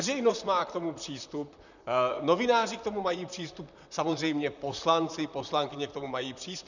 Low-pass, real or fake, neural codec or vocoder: 7.2 kHz; fake; vocoder, 44.1 kHz, 128 mel bands, Pupu-Vocoder